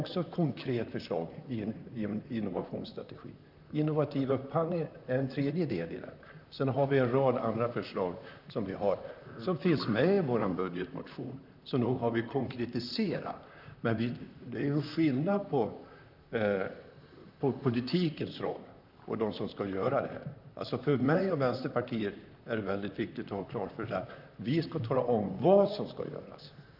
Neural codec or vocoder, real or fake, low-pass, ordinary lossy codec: vocoder, 44.1 kHz, 128 mel bands, Pupu-Vocoder; fake; 5.4 kHz; none